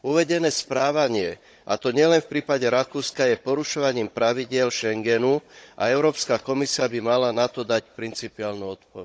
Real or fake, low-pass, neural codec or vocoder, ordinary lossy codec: fake; none; codec, 16 kHz, 16 kbps, FunCodec, trained on Chinese and English, 50 frames a second; none